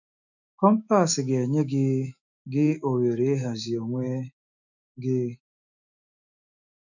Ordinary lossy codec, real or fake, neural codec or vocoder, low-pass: none; fake; autoencoder, 48 kHz, 128 numbers a frame, DAC-VAE, trained on Japanese speech; 7.2 kHz